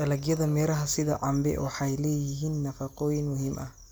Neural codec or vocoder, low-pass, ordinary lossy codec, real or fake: none; none; none; real